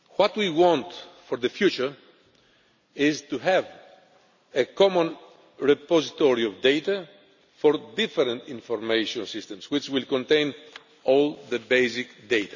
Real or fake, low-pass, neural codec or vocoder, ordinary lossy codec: real; 7.2 kHz; none; none